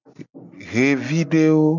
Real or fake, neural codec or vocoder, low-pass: real; none; 7.2 kHz